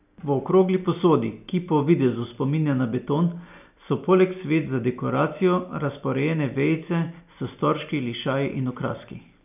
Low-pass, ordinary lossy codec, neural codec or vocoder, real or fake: 3.6 kHz; none; none; real